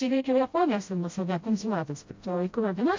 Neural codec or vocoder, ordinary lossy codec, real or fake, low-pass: codec, 16 kHz, 0.5 kbps, FreqCodec, smaller model; MP3, 48 kbps; fake; 7.2 kHz